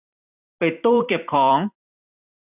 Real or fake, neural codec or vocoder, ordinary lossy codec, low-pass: fake; vocoder, 44.1 kHz, 128 mel bands every 256 samples, BigVGAN v2; none; 3.6 kHz